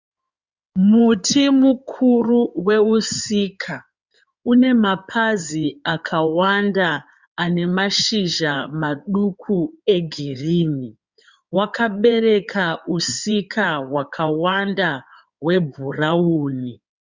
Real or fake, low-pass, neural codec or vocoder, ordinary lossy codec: fake; 7.2 kHz; codec, 16 kHz in and 24 kHz out, 2.2 kbps, FireRedTTS-2 codec; Opus, 64 kbps